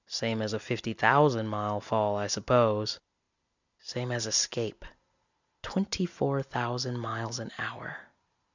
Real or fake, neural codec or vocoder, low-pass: real; none; 7.2 kHz